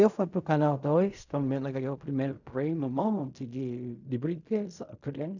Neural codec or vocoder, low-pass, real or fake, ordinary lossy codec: codec, 16 kHz in and 24 kHz out, 0.4 kbps, LongCat-Audio-Codec, fine tuned four codebook decoder; 7.2 kHz; fake; none